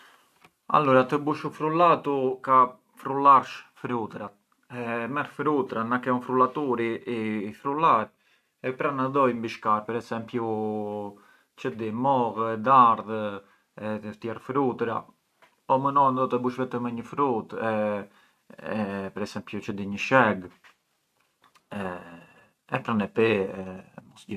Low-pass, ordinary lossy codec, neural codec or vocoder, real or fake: 14.4 kHz; none; none; real